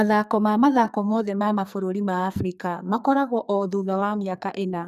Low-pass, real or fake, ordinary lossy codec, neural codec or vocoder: 14.4 kHz; fake; none; codec, 44.1 kHz, 2.6 kbps, SNAC